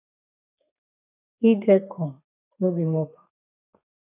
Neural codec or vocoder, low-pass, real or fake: codec, 32 kHz, 1.9 kbps, SNAC; 3.6 kHz; fake